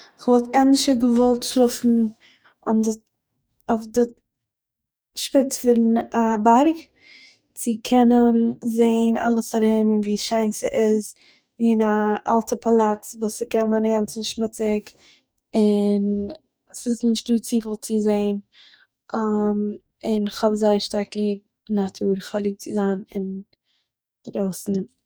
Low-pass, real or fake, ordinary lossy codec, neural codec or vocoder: none; fake; none; codec, 44.1 kHz, 2.6 kbps, DAC